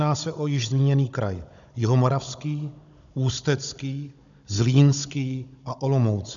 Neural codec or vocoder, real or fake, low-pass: codec, 16 kHz, 16 kbps, FunCodec, trained on Chinese and English, 50 frames a second; fake; 7.2 kHz